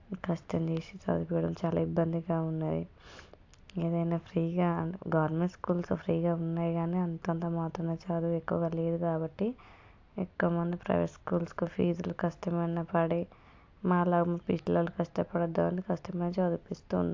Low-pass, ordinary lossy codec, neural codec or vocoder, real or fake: 7.2 kHz; AAC, 48 kbps; none; real